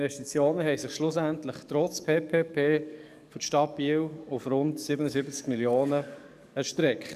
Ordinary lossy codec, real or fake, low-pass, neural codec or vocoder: none; fake; 14.4 kHz; codec, 44.1 kHz, 7.8 kbps, DAC